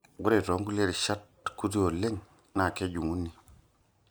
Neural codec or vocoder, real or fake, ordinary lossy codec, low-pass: none; real; none; none